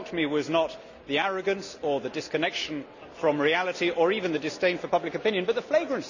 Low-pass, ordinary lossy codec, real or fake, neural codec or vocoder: 7.2 kHz; none; real; none